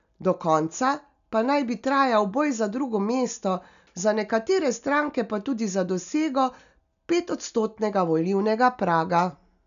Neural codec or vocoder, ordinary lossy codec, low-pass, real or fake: none; none; 7.2 kHz; real